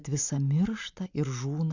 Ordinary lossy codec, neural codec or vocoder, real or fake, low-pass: Opus, 64 kbps; none; real; 7.2 kHz